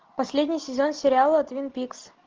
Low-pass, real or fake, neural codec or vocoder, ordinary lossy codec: 7.2 kHz; real; none; Opus, 16 kbps